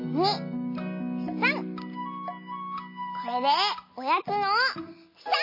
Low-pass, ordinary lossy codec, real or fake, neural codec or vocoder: 5.4 kHz; MP3, 24 kbps; real; none